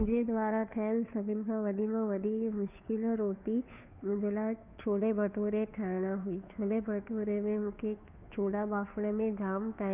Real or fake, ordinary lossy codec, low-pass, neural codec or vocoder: fake; none; 3.6 kHz; codec, 16 kHz, 2 kbps, FunCodec, trained on Chinese and English, 25 frames a second